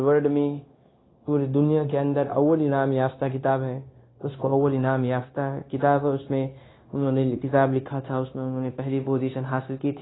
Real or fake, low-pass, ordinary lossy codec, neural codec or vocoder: fake; 7.2 kHz; AAC, 16 kbps; codec, 16 kHz, 0.9 kbps, LongCat-Audio-Codec